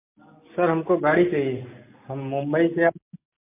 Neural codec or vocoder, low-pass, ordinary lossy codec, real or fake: none; 3.6 kHz; MP3, 24 kbps; real